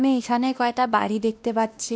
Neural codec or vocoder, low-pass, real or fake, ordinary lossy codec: codec, 16 kHz, 1 kbps, X-Codec, WavLM features, trained on Multilingual LibriSpeech; none; fake; none